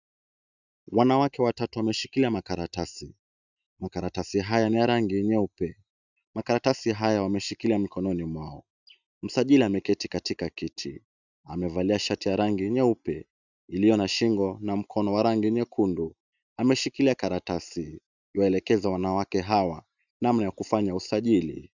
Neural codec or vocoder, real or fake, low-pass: none; real; 7.2 kHz